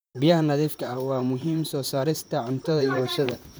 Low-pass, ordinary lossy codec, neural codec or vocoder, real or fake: none; none; vocoder, 44.1 kHz, 128 mel bands, Pupu-Vocoder; fake